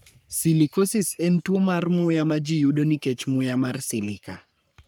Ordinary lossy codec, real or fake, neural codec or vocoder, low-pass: none; fake; codec, 44.1 kHz, 3.4 kbps, Pupu-Codec; none